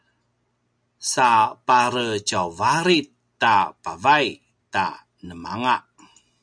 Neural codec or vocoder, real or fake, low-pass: none; real; 9.9 kHz